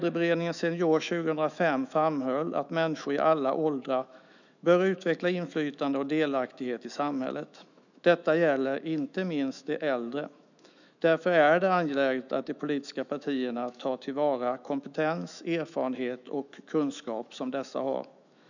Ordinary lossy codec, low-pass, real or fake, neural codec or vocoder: none; 7.2 kHz; fake; autoencoder, 48 kHz, 128 numbers a frame, DAC-VAE, trained on Japanese speech